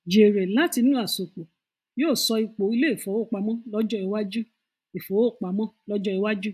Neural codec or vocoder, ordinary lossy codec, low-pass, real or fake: none; AAC, 96 kbps; 14.4 kHz; real